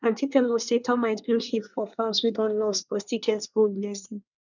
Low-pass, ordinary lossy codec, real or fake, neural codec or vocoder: 7.2 kHz; none; fake; codec, 24 kHz, 1 kbps, SNAC